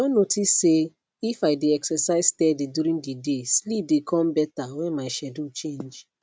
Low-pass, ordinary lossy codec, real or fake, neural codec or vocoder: none; none; real; none